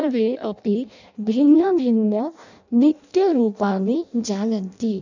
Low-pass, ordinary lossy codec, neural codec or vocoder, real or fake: 7.2 kHz; none; codec, 16 kHz in and 24 kHz out, 0.6 kbps, FireRedTTS-2 codec; fake